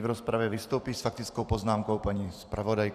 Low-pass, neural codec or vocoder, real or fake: 14.4 kHz; vocoder, 44.1 kHz, 128 mel bands every 512 samples, BigVGAN v2; fake